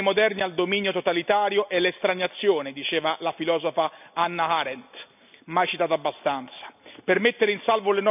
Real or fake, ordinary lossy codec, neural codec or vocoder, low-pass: real; none; none; 3.6 kHz